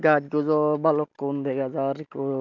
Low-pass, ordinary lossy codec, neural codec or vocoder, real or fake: 7.2 kHz; none; none; real